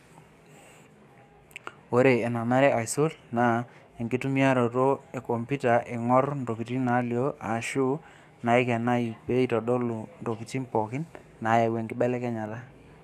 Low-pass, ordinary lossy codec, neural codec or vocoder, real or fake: 14.4 kHz; none; codec, 44.1 kHz, 7.8 kbps, DAC; fake